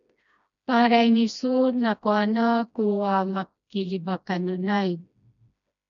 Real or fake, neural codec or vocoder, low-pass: fake; codec, 16 kHz, 1 kbps, FreqCodec, smaller model; 7.2 kHz